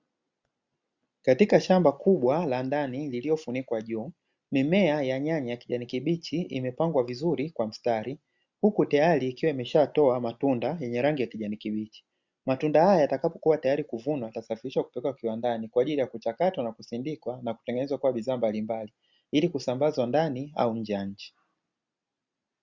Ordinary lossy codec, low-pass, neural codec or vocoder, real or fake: Opus, 64 kbps; 7.2 kHz; none; real